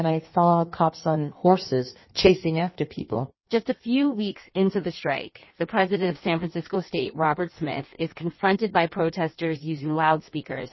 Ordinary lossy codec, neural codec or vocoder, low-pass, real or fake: MP3, 24 kbps; codec, 16 kHz in and 24 kHz out, 1.1 kbps, FireRedTTS-2 codec; 7.2 kHz; fake